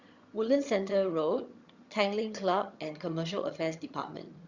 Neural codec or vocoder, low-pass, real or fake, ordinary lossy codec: vocoder, 22.05 kHz, 80 mel bands, HiFi-GAN; 7.2 kHz; fake; Opus, 64 kbps